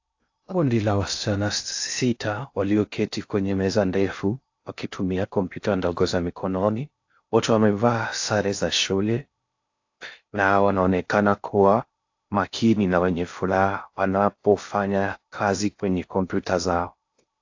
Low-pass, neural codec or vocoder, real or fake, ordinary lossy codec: 7.2 kHz; codec, 16 kHz in and 24 kHz out, 0.6 kbps, FocalCodec, streaming, 2048 codes; fake; AAC, 48 kbps